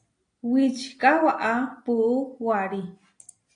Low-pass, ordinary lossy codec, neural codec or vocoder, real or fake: 9.9 kHz; AAC, 48 kbps; none; real